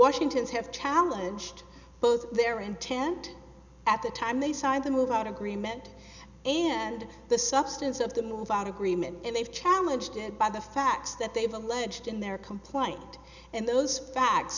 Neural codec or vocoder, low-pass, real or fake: none; 7.2 kHz; real